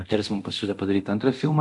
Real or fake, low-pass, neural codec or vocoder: fake; 10.8 kHz; codec, 24 kHz, 0.9 kbps, DualCodec